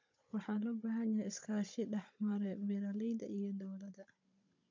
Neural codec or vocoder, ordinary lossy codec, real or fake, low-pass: codec, 16 kHz, 16 kbps, FunCodec, trained on Chinese and English, 50 frames a second; MP3, 48 kbps; fake; 7.2 kHz